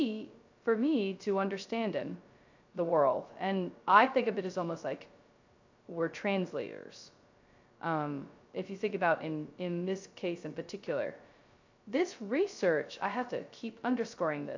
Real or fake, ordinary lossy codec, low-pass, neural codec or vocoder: fake; AAC, 48 kbps; 7.2 kHz; codec, 16 kHz, 0.2 kbps, FocalCodec